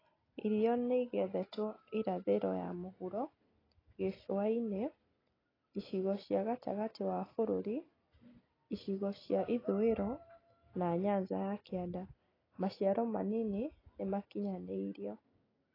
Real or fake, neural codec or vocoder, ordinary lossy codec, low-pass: real; none; AAC, 24 kbps; 5.4 kHz